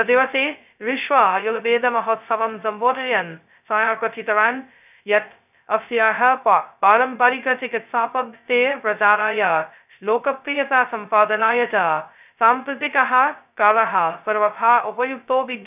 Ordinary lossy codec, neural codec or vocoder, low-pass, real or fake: none; codec, 16 kHz, 0.2 kbps, FocalCodec; 3.6 kHz; fake